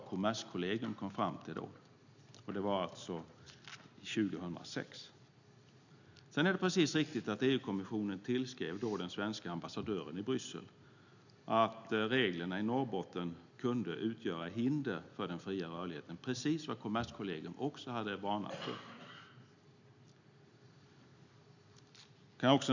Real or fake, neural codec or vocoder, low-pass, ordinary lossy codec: real; none; 7.2 kHz; none